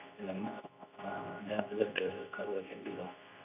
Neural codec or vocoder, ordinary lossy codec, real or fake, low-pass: codec, 24 kHz, 0.9 kbps, WavTokenizer, medium speech release version 2; AAC, 32 kbps; fake; 3.6 kHz